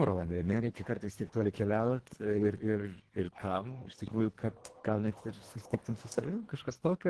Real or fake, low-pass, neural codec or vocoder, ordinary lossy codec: fake; 10.8 kHz; codec, 24 kHz, 1.5 kbps, HILCodec; Opus, 16 kbps